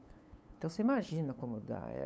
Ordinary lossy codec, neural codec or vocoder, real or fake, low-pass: none; codec, 16 kHz, 8 kbps, FunCodec, trained on LibriTTS, 25 frames a second; fake; none